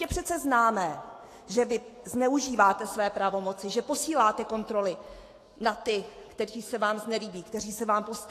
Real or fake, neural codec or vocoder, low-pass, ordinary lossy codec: fake; autoencoder, 48 kHz, 128 numbers a frame, DAC-VAE, trained on Japanese speech; 14.4 kHz; AAC, 48 kbps